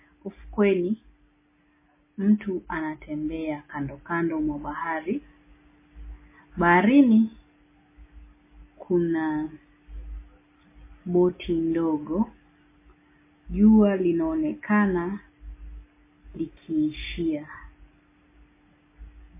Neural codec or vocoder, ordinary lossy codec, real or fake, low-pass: none; MP3, 16 kbps; real; 3.6 kHz